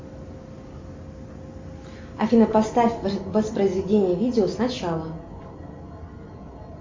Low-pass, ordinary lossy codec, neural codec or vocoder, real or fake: 7.2 kHz; MP3, 64 kbps; none; real